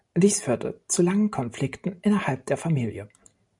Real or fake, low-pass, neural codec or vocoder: real; 10.8 kHz; none